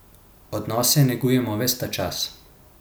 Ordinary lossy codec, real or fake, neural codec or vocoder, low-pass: none; real; none; none